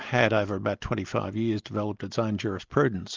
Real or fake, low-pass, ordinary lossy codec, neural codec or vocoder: real; 7.2 kHz; Opus, 24 kbps; none